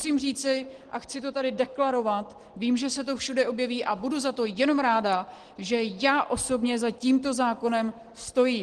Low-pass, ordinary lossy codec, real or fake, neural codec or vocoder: 9.9 kHz; Opus, 16 kbps; real; none